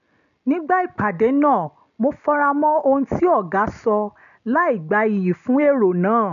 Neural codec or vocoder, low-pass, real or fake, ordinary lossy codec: none; 7.2 kHz; real; none